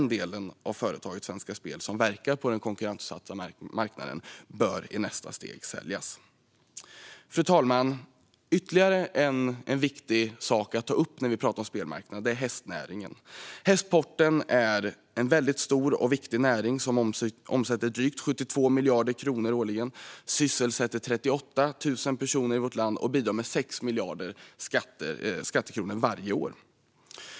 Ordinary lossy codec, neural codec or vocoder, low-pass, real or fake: none; none; none; real